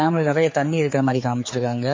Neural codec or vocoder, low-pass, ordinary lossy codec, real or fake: codec, 16 kHz, 4 kbps, X-Codec, HuBERT features, trained on general audio; 7.2 kHz; MP3, 32 kbps; fake